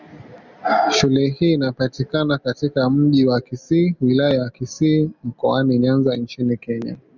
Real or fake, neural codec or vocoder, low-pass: real; none; 7.2 kHz